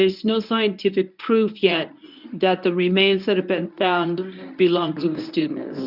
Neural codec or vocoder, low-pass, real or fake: codec, 24 kHz, 0.9 kbps, WavTokenizer, medium speech release version 1; 5.4 kHz; fake